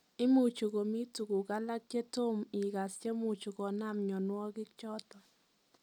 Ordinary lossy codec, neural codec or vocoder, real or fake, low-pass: none; none; real; 19.8 kHz